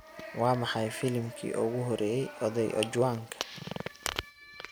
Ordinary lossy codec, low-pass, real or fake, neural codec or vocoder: none; none; real; none